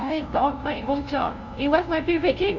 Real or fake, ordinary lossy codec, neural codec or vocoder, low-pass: fake; none; codec, 16 kHz, 0.5 kbps, FunCodec, trained on LibriTTS, 25 frames a second; 7.2 kHz